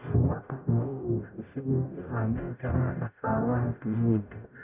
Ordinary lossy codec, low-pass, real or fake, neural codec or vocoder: none; 3.6 kHz; fake; codec, 44.1 kHz, 0.9 kbps, DAC